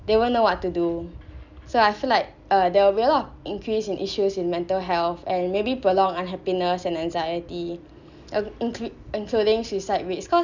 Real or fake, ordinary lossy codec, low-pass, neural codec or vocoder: real; none; 7.2 kHz; none